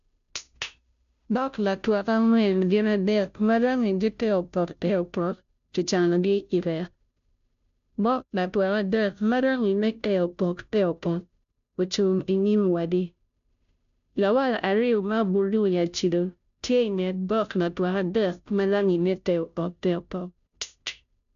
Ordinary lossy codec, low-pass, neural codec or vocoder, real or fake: AAC, 96 kbps; 7.2 kHz; codec, 16 kHz, 0.5 kbps, FunCodec, trained on Chinese and English, 25 frames a second; fake